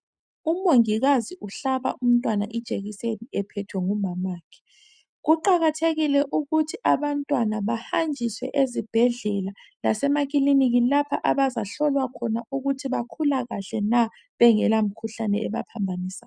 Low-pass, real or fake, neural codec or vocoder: 9.9 kHz; real; none